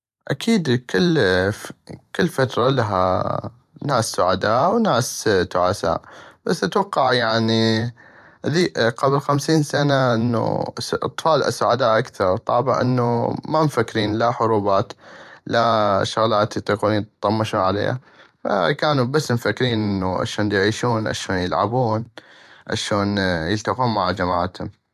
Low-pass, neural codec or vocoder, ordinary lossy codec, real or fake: 14.4 kHz; vocoder, 44.1 kHz, 128 mel bands every 512 samples, BigVGAN v2; none; fake